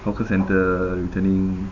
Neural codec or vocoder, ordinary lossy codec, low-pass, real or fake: none; none; 7.2 kHz; real